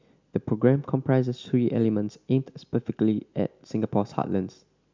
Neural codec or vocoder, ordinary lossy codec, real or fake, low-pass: none; MP3, 64 kbps; real; 7.2 kHz